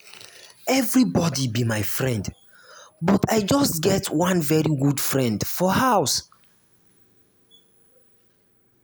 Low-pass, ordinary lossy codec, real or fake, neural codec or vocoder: none; none; real; none